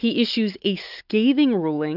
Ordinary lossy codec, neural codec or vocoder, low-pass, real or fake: AAC, 48 kbps; none; 5.4 kHz; real